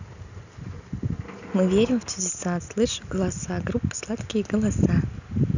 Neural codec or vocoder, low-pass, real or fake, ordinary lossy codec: none; 7.2 kHz; real; none